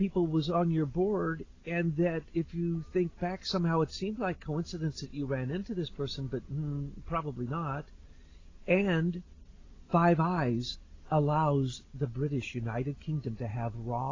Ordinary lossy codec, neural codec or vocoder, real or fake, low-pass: AAC, 32 kbps; none; real; 7.2 kHz